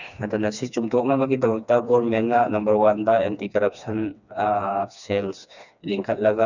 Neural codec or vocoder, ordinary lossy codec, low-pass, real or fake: codec, 16 kHz, 2 kbps, FreqCodec, smaller model; none; 7.2 kHz; fake